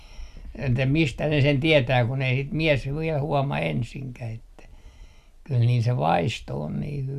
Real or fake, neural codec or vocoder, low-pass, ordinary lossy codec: real; none; 14.4 kHz; none